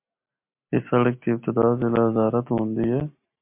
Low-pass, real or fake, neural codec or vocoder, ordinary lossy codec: 3.6 kHz; real; none; MP3, 32 kbps